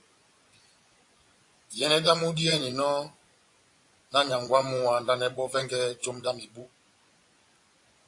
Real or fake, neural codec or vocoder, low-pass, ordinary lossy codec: fake; vocoder, 24 kHz, 100 mel bands, Vocos; 10.8 kHz; AAC, 64 kbps